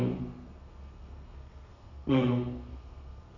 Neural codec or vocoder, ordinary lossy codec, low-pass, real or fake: codec, 32 kHz, 1.9 kbps, SNAC; none; 7.2 kHz; fake